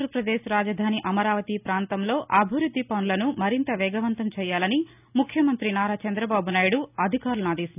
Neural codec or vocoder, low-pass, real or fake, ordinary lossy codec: none; 3.6 kHz; real; none